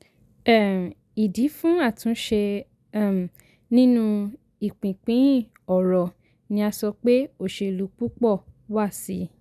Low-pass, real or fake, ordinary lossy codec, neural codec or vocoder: 14.4 kHz; real; none; none